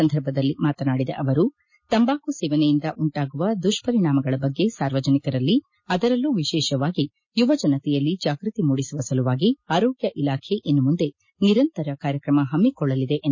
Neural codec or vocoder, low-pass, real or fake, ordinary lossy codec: none; 7.2 kHz; real; MP3, 32 kbps